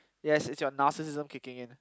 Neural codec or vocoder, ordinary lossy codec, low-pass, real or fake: none; none; none; real